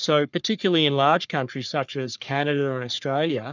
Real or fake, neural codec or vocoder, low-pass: fake; codec, 44.1 kHz, 3.4 kbps, Pupu-Codec; 7.2 kHz